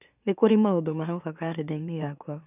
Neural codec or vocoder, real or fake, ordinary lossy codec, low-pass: autoencoder, 44.1 kHz, a latent of 192 numbers a frame, MeloTTS; fake; none; 3.6 kHz